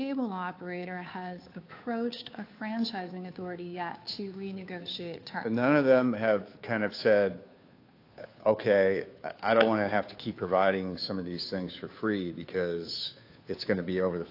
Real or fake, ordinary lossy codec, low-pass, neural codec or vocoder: fake; AAC, 32 kbps; 5.4 kHz; codec, 16 kHz, 2 kbps, FunCodec, trained on Chinese and English, 25 frames a second